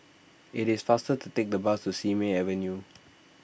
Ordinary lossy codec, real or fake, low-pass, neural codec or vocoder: none; real; none; none